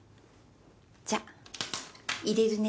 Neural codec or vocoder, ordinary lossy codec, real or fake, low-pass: none; none; real; none